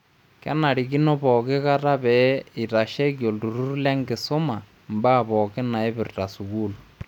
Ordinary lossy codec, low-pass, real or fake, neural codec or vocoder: none; 19.8 kHz; real; none